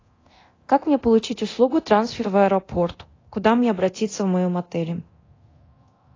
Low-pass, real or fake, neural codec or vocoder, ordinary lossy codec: 7.2 kHz; fake; codec, 24 kHz, 0.9 kbps, DualCodec; AAC, 32 kbps